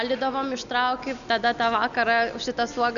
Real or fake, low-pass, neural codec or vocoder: real; 7.2 kHz; none